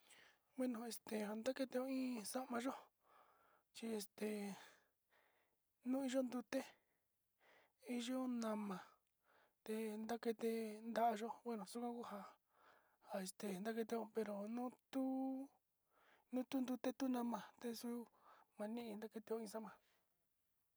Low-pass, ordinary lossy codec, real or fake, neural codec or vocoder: none; none; real; none